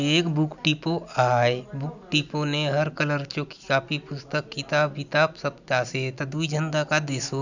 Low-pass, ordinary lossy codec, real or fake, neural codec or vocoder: 7.2 kHz; none; real; none